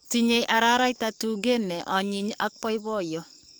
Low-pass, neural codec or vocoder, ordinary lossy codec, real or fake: none; codec, 44.1 kHz, 7.8 kbps, DAC; none; fake